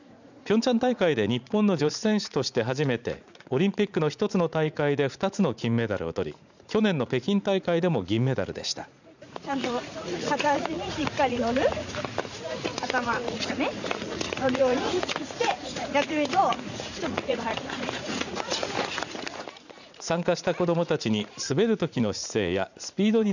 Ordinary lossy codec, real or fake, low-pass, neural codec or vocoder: none; fake; 7.2 kHz; vocoder, 44.1 kHz, 80 mel bands, Vocos